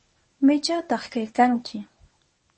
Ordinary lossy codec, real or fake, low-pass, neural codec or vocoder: MP3, 32 kbps; fake; 10.8 kHz; codec, 24 kHz, 0.9 kbps, WavTokenizer, medium speech release version 1